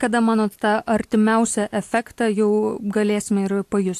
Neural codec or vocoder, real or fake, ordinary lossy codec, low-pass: none; real; AAC, 64 kbps; 14.4 kHz